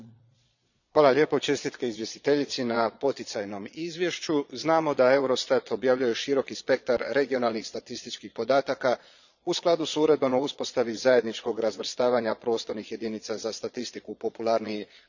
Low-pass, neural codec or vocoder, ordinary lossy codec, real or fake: 7.2 kHz; vocoder, 22.05 kHz, 80 mel bands, Vocos; none; fake